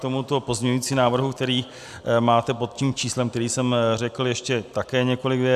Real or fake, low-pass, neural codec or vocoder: real; 14.4 kHz; none